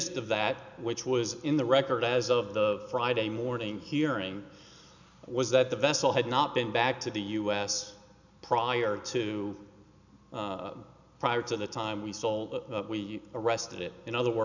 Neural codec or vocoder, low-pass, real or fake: none; 7.2 kHz; real